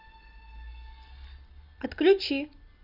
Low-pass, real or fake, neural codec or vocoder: 5.4 kHz; real; none